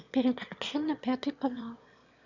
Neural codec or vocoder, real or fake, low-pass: autoencoder, 22.05 kHz, a latent of 192 numbers a frame, VITS, trained on one speaker; fake; 7.2 kHz